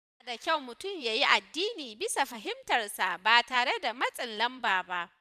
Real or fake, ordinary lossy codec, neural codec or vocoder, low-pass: fake; none; vocoder, 44.1 kHz, 128 mel bands every 512 samples, BigVGAN v2; 14.4 kHz